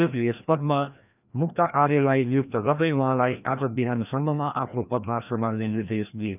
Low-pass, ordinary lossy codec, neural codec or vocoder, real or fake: 3.6 kHz; none; codec, 16 kHz, 1 kbps, FreqCodec, larger model; fake